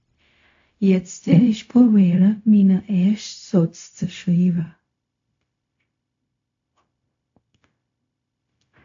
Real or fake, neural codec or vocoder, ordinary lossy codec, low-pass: fake; codec, 16 kHz, 0.4 kbps, LongCat-Audio-Codec; AAC, 48 kbps; 7.2 kHz